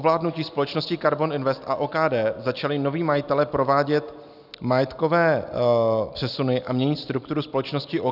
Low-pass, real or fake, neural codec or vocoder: 5.4 kHz; real; none